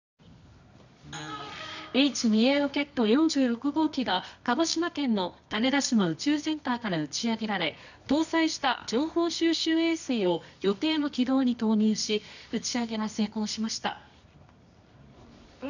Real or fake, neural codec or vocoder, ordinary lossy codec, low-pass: fake; codec, 24 kHz, 0.9 kbps, WavTokenizer, medium music audio release; none; 7.2 kHz